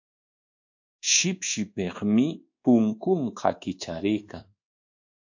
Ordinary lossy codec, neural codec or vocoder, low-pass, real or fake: AAC, 48 kbps; codec, 24 kHz, 1.2 kbps, DualCodec; 7.2 kHz; fake